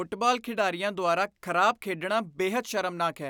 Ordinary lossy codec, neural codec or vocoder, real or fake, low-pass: none; none; real; none